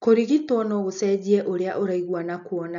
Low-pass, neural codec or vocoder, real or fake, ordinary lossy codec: 7.2 kHz; none; real; none